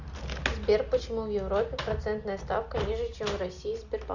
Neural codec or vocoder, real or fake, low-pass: none; real; 7.2 kHz